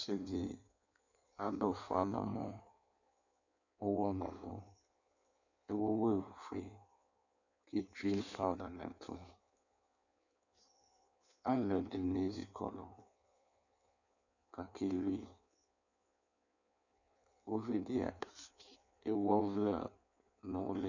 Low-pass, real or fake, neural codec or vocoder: 7.2 kHz; fake; codec, 16 kHz in and 24 kHz out, 1.1 kbps, FireRedTTS-2 codec